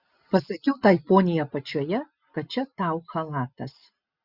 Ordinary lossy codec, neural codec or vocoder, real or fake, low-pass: Opus, 64 kbps; none; real; 5.4 kHz